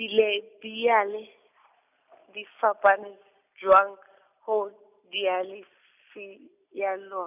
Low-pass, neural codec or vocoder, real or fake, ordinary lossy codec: 3.6 kHz; none; real; none